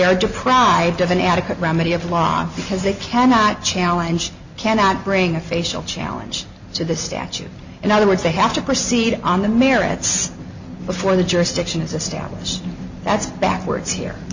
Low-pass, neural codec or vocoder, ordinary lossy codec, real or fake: 7.2 kHz; none; Opus, 64 kbps; real